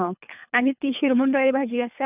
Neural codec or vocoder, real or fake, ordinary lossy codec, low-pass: codec, 24 kHz, 3 kbps, HILCodec; fake; none; 3.6 kHz